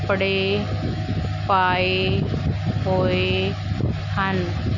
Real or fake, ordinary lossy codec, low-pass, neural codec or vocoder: real; none; 7.2 kHz; none